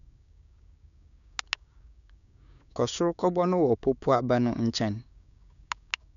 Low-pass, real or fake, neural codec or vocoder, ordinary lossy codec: 7.2 kHz; fake; codec, 16 kHz, 6 kbps, DAC; none